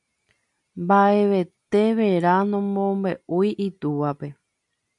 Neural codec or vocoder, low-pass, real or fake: none; 10.8 kHz; real